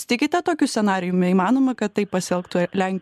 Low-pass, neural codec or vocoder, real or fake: 14.4 kHz; none; real